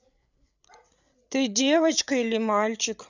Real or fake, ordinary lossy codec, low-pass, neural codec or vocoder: fake; none; 7.2 kHz; codec, 16 kHz, 8 kbps, FreqCodec, larger model